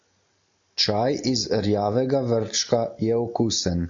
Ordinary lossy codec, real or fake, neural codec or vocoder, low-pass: AAC, 48 kbps; real; none; 7.2 kHz